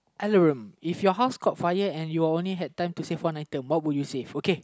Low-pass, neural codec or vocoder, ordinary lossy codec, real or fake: none; none; none; real